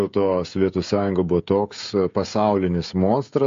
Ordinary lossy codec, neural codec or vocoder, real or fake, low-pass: MP3, 48 kbps; codec, 16 kHz, 16 kbps, FreqCodec, smaller model; fake; 7.2 kHz